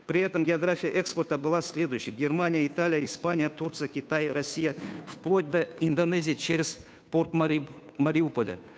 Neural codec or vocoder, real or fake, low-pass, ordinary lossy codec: codec, 16 kHz, 2 kbps, FunCodec, trained on Chinese and English, 25 frames a second; fake; none; none